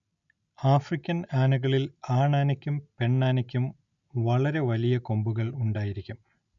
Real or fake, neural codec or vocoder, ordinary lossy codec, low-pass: real; none; none; 7.2 kHz